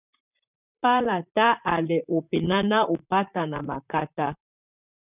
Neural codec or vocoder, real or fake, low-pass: vocoder, 44.1 kHz, 128 mel bands, Pupu-Vocoder; fake; 3.6 kHz